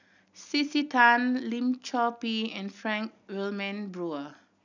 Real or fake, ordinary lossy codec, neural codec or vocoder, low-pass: real; none; none; 7.2 kHz